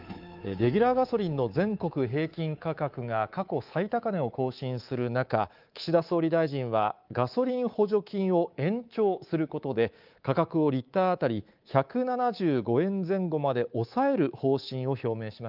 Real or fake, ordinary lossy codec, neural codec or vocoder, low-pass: fake; Opus, 32 kbps; codec, 24 kHz, 3.1 kbps, DualCodec; 5.4 kHz